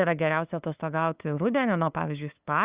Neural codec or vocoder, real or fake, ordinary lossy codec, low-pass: codec, 16 kHz, 2 kbps, FunCodec, trained on LibriTTS, 25 frames a second; fake; Opus, 64 kbps; 3.6 kHz